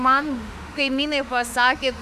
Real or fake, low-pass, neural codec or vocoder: fake; 14.4 kHz; autoencoder, 48 kHz, 32 numbers a frame, DAC-VAE, trained on Japanese speech